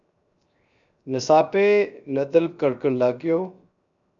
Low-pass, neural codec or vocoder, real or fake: 7.2 kHz; codec, 16 kHz, 0.7 kbps, FocalCodec; fake